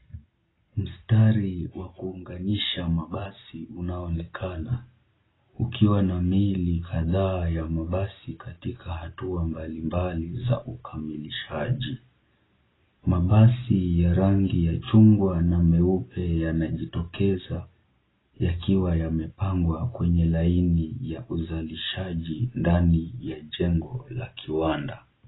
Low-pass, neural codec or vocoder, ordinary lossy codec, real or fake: 7.2 kHz; none; AAC, 16 kbps; real